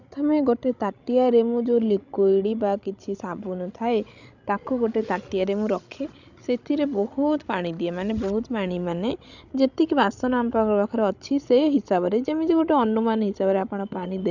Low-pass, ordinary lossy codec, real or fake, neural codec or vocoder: 7.2 kHz; none; fake; codec, 16 kHz, 16 kbps, FreqCodec, larger model